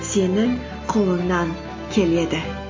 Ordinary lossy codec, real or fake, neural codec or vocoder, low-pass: MP3, 32 kbps; real; none; 7.2 kHz